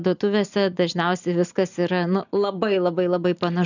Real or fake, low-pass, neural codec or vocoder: real; 7.2 kHz; none